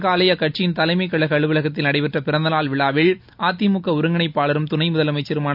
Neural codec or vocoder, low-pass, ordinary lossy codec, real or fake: none; 5.4 kHz; none; real